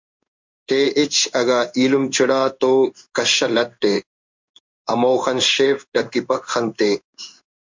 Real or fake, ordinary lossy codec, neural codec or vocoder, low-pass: fake; MP3, 64 kbps; codec, 16 kHz in and 24 kHz out, 1 kbps, XY-Tokenizer; 7.2 kHz